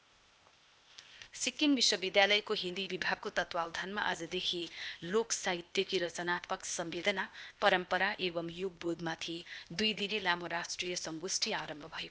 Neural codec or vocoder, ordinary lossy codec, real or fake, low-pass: codec, 16 kHz, 0.8 kbps, ZipCodec; none; fake; none